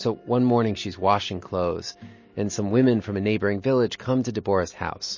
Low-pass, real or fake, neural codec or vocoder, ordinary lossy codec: 7.2 kHz; real; none; MP3, 32 kbps